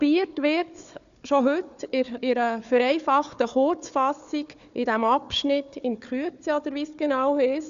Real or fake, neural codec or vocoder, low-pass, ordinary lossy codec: fake; codec, 16 kHz, 4 kbps, FunCodec, trained on Chinese and English, 50 frames a second; 7.2 kHz; none